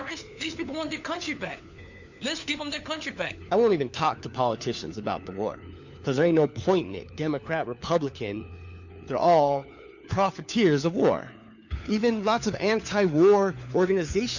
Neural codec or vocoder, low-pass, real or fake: codec, 16 kHz, 4 kbps, FunCodec, trained on LibriTTS, 50 frames a second; 7.2 kHz; fake